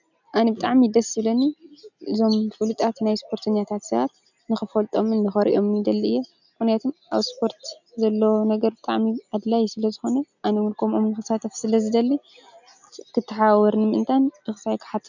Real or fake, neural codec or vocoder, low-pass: real; none; 7.2 kHz